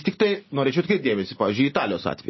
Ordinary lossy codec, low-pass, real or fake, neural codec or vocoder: MP3, 24 kbps; 7.2 kHz; real; none